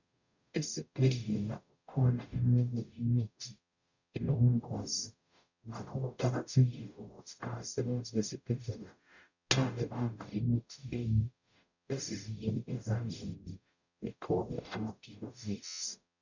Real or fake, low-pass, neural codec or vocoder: fake; 7.2 kHz; codec, 44.1 kHz, 0.9 kbps, DAC